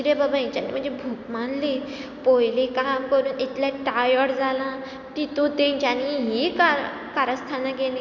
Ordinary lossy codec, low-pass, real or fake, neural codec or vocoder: none; 7.2 kHz; real; none